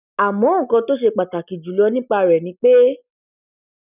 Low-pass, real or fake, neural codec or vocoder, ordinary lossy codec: 3.6 kHz; real; none; none